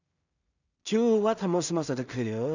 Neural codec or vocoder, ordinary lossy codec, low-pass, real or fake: codec, 16 kHz in and 24 kHz out, 0.4 kbps, LongCat-Audio-Codec, two codebook decoder; none; 7.2 kHz; fake